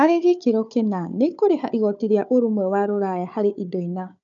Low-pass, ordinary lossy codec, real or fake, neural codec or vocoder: 7.2 kHz; none; fake; codec, 16 kHz, 4 kbps, FunCodec, trained on Chinese and English, 50 frames a second